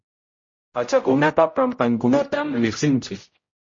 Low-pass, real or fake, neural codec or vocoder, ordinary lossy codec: 7.2 kHz; fake; codec, 16 kHz, 0.5 kbps, X-Codec, HuBERT features, trained on general audio; MP3, 32 kbps